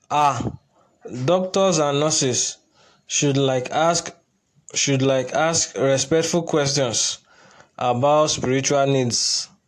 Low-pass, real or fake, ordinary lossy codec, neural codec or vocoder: 14.4 kHz; real; AAC, 64 kbps; none